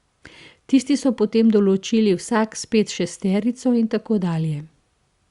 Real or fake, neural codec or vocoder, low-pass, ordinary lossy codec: real; none; 10.8 kHz; Opus, 64 kbps